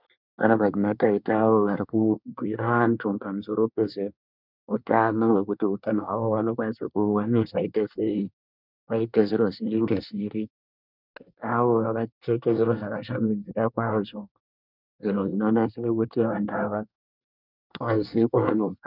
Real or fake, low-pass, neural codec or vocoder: fake; 5.4 kHz; codec, 24 kHz, 1 kbps, SNAC